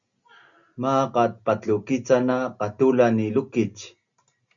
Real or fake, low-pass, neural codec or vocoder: real; 7.2 kHz; none